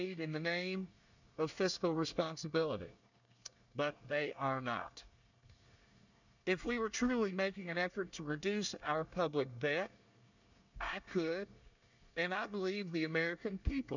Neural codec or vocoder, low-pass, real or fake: codec, 24 kHz, 1 kbps, SNAC; 7.2 kHz; fake